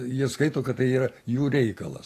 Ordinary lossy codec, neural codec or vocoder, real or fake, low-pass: AAC, 48 kbps; none; real; 14.4 kHz